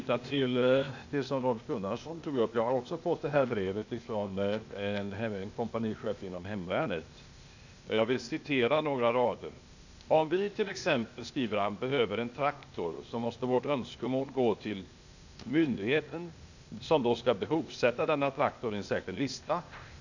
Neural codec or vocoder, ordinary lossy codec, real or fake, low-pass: codec, 16 kHz, 0.8 kbps, ZipCodec; none; fake; 7.2 kHz